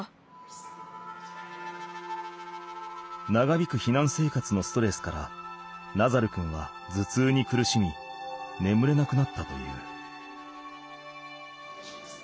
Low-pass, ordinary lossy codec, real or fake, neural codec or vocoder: none; none; real; none